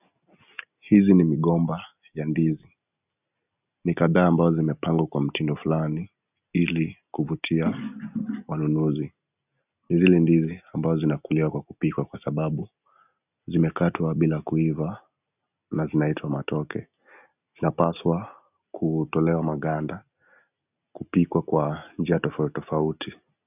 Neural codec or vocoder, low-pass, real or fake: none; 3.6 kHz; real